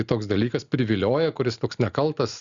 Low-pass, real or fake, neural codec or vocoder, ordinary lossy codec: 7.2 kHz; real; none; Opus, 64 kbps